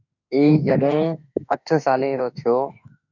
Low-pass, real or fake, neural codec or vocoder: 7.2 kHz; fake; autoencoder, 48 kHz, 32 numbers a frame, DAC-VAE, trained on Japanese speech